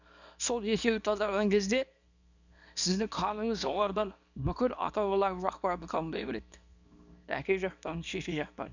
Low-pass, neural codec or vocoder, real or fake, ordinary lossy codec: 7.2 kHz; codec, 24 kHz, 0.9 kbps, WavTokenizer, small release; fake; none